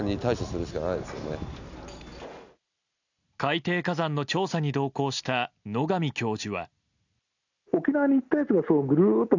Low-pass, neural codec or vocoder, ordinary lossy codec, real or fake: 7.2 kHz; none; none; real